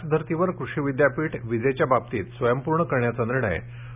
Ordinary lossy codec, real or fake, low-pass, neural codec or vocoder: none; real; 3.6 kHz; none